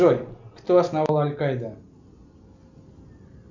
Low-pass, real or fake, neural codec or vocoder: 7.2 kHz; real; none